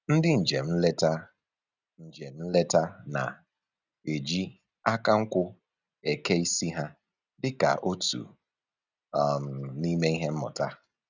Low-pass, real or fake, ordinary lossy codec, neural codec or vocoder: 7.2 kHz; real; none; none